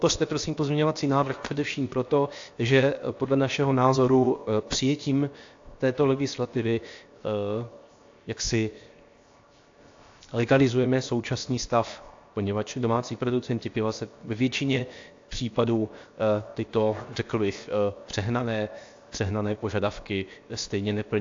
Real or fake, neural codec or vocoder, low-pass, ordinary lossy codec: fake; codec, 16 kHz, 0.7 kbps, FocalCodec; 7.2 kHz; AAC, 48 kbps